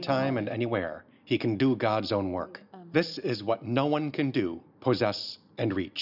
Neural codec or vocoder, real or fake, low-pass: none; real; 5.4 kHz